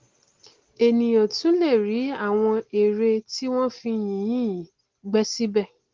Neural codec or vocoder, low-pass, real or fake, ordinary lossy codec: none; 7.2 kHz; real; Opus, 16 kbps